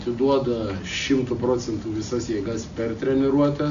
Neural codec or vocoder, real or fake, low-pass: none; real; 7.2 kHz